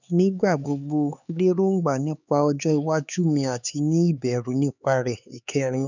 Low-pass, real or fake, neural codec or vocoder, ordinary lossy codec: 7.2 kHz; fake; codec, 16 kHz, 4 kbps, X-Codec, HuBERT features, trained on LibriSpeech; none